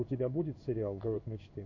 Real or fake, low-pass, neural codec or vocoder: fake; 7.2 kHz; codec, 16 kHz in and 24 kHz out, 1 kbps, XY-Tokenizer